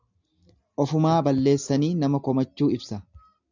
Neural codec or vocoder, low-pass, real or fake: none; 7.2 kHz; real